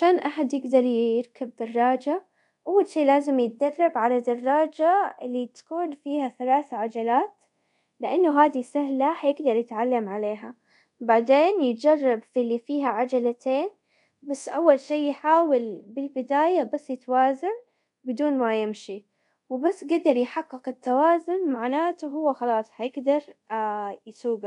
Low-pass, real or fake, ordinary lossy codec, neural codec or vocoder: 10.8 kHz; fake; none; codec, 24 kHz, 0.5 kbps, DualCodec